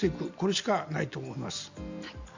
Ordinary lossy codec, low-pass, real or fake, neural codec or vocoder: Opus, 64 kbps; 7.2 kHz; real; none